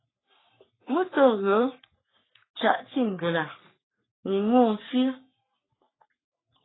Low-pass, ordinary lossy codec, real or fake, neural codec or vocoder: 7.2 kHz; AAC, 16 kbps; fake; codec, 44.1 kHz, 3.4 kbps, Pupu-Codec